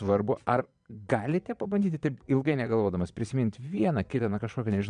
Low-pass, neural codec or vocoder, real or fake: 9.9 kHz; vocoder, 22.05 kHz, 80 mel bands, Vocos; fake